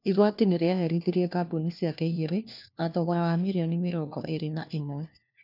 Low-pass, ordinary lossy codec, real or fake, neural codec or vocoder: 5.4 kHz; none; fake; codec, 16 kHz, 1 kbps, FunCodec, trained on LibriTTS, 50 frames a second